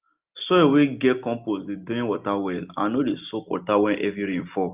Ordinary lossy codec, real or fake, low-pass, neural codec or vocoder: Opus, 24 kbps; real; 3.6 kHz; none